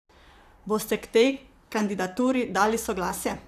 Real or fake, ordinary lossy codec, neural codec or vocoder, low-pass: fake; none; vocoder, 44.1 kHz, 128 mel bands, Pupu-Vocoder; 14.4 kHz